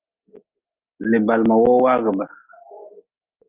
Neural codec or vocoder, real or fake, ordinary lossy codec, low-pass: none; real; Opus, 24 kbps; 3.6 kHz